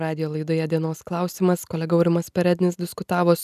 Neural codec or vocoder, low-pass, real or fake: none; 14.4 kHz; real